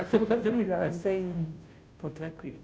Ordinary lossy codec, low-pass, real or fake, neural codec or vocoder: none; none; fake; codec, 16 kHz, 0.5 kbps, FunCodec, trained on Chinese and English, 25 frames a second